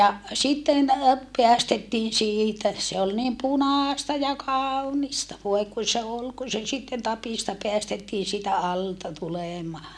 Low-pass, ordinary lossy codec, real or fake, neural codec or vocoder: none; none; real; none